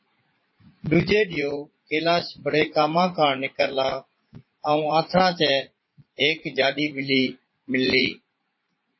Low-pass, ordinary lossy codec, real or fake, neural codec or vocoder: 7.2 kHz; MP3, 24 kbps; fake; vocoder, 44.1 kHz, 80 mel bands, Vocos